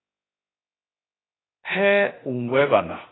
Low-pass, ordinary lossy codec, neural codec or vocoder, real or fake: 7.2 kHz; AAC, 16 kbps; codec, 16 kHz, 0.7 kbps, FocalCodec; fake